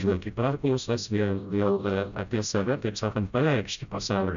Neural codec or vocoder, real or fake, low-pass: codec, 16 kHz, 0.5 kbps, FreqCodec, smaller model; fake; 7.2 kHz